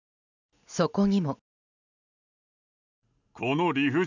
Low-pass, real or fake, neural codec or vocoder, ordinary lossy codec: 7.2 kHz; real; none; none